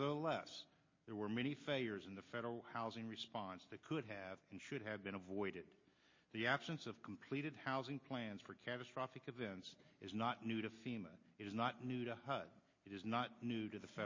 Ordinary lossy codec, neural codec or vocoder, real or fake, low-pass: MP3, 32 kbps; none; real; 7.2 kHz